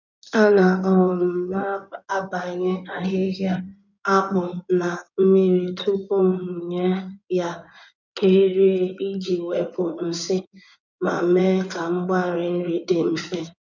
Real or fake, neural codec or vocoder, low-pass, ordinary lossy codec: fake; codec, 16 kHz in and 24 kHz out, 2.2 kbps, FireRedTTS-2 codec; 7.2 kHz; none